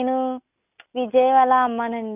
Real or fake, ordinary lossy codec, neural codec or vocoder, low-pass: real; none; none; 3.6 kHz